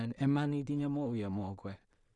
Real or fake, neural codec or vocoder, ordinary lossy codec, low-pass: fake; codec, 16 kHz in and 24 kHz out, 0.4 kbps, LongCat-Audio-Codec, two codebook decoder; none; 10.8 kHz